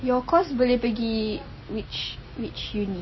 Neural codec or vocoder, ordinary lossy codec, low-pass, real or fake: none; MP3, 24 kbps; 7.2 kHz; real